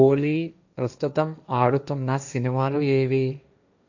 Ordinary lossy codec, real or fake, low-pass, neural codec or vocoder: none; fake; 7.2 kHz; codec, 16 kHz, 1.1 kbps, Voila-Tokenizer